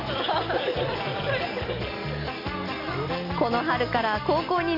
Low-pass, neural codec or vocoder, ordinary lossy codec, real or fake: 5.4 kHz; none; none; real